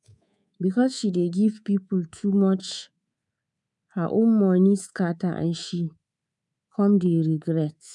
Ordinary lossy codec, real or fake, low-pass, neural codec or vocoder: none; fake; 10.8 kHz; codec, 24 kHz, 3.1 kbps, DualCodec